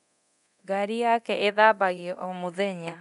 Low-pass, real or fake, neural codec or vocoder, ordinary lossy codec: 10.8 kHz; fake; codec, 24 kHz, 0.9 kbps, DualCodec; none